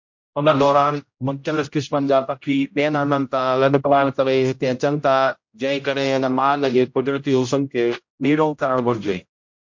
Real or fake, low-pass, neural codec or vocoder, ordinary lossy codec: fake; 7.2 kHz; codec, 16 kHz, 0.5 kbps, X-Codec, HuBERT features, trained on general audio; MP3, 48 kbps